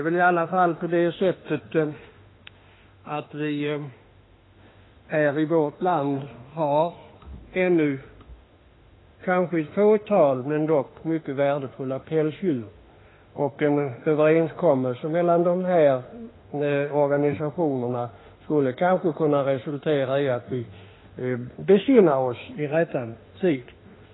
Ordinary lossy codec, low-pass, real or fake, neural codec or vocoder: AAC, 16 kbps; 7.2 kHz; fake; autoencoder, 48 kHz, 32 numbers a frame, DAC-VAE, trained on Japanese speech